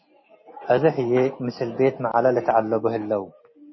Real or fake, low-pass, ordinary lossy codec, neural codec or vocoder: real; 7.2 kHz; MP3, 24 kbps; none